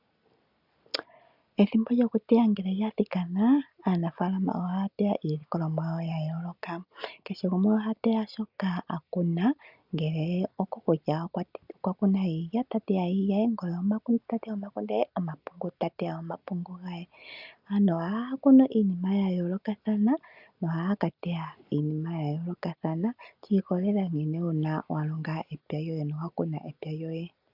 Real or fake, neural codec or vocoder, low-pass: real; none; 5.4 kHz